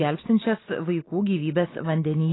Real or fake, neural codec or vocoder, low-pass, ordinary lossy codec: real; none; 7.2 kHz; AAC, 16 kbps